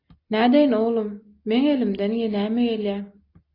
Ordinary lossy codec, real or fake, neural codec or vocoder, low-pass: AAC, 32 kbps; real; none; 5.4 kHz